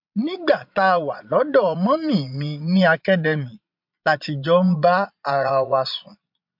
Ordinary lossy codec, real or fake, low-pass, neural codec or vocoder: MP3, 48 kbps; fake; 5.4 kHz; vocoder, 22.05 kHz, 80 mel bands, Vocos